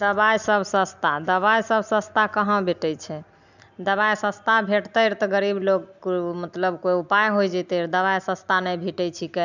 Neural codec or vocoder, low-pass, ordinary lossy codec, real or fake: none; 7.2 kHz; none; real